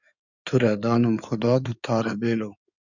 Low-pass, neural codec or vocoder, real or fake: 7.2 kHz; codec, 16 kHz in and 24 kHz out, 2.2 kbps, FireRedTTS-2 codec; fake